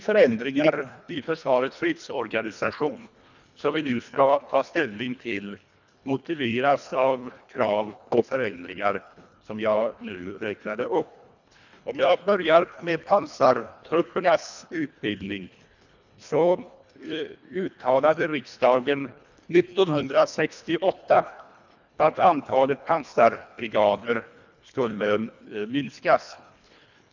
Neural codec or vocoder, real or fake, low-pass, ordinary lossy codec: codec, 24 kHz, 1.5 kbps, HILCodec; fake; 7.2 kHz; none